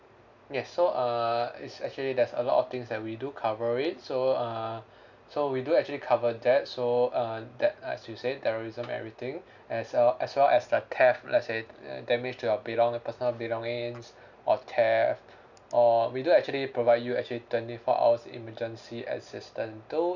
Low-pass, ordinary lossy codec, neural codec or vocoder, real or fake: 7.2 kHz; none; none; real